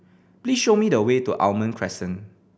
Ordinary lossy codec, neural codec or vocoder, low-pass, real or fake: none; none; none; real